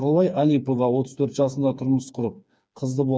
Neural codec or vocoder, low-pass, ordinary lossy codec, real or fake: codec, 16 kHz, 4 kbps, FreqCodec, smaller model; none; none; fake